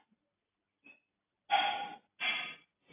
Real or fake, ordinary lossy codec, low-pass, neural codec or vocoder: real; AAC, 24 kbps; 3.6 kHz; none